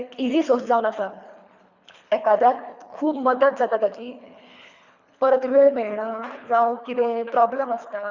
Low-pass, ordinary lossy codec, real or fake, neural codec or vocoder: 7.2 kHz; Opus, 64 kbps; fake; codec, 24 kHz, 3 kbps, HILCodec